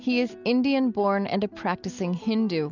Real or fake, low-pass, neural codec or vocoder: real; 7.2 kHz; none